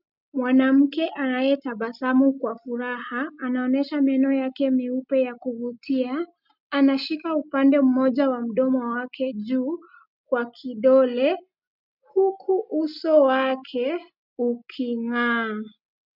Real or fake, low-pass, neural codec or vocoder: real; 5.4 kHz; none